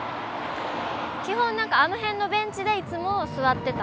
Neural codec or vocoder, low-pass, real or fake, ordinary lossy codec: none; none; real; none